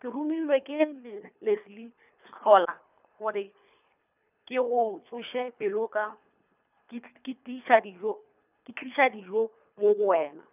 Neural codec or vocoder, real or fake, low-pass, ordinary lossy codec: codec, 24 kHz, 3 kbps, HILCodec; fake; 3.6 kHz; none